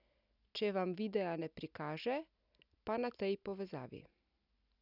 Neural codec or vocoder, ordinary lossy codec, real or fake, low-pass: none; none; real; 5.4 kHz